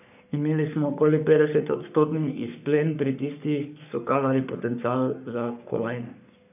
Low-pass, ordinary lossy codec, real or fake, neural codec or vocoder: 3.6 kHz; none; fake; codec, 44.1 kHz, 3.4 kbps, Pupu-Codec